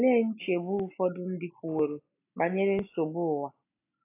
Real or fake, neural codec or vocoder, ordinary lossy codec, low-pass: real; none; AAC, 24 kbps; 3.6 kHz